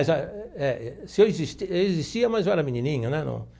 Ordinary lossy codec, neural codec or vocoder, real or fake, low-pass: none; none; real; none